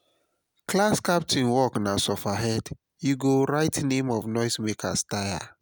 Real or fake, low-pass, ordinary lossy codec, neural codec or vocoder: real; none; none; none